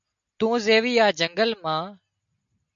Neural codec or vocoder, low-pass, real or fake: none; 7.2 kHz; real